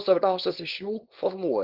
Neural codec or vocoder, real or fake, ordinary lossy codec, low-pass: codec, 24 kHz, 0.9 kbps, WavTokenizer, small release; fake; Opus, 16 kbps; 5.4 kHz